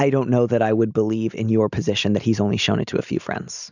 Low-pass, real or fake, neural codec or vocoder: 7.2 kHz; real; none